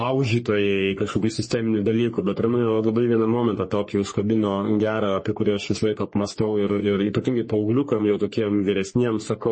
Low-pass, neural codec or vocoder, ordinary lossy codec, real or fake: 9.9 kHz; codec, 44.1 kHz, 3.4 kbps, Pupu-Codec; MP3, 32 kbps; fake